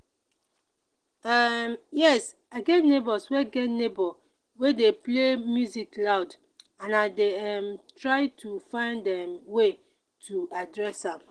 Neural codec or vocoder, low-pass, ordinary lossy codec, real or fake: none; 9.9 kHz; Opus, 16 kbps; real